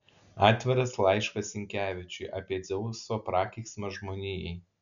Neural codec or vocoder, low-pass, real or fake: none; 7.2 kHz; real